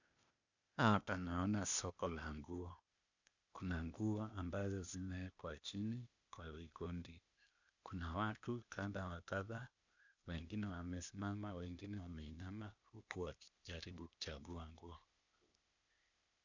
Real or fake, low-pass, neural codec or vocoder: fake; 7.2 kHz; codec, 16 kHz, 0.8 kbps, ZipCodec